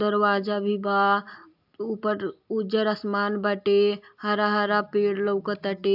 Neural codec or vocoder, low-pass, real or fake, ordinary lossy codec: none; 5.4 kHz; real; AAC, 48 kbps